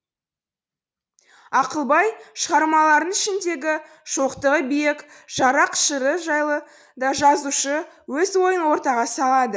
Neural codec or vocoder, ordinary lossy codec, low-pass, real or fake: none; none; none; real